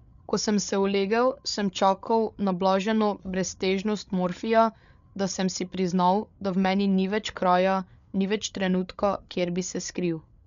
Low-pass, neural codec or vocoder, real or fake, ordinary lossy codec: 7.2 kHz; codec, 16 kHz, 8 kbps, FreqCodec, larger model; fake; MP3, 96 kbps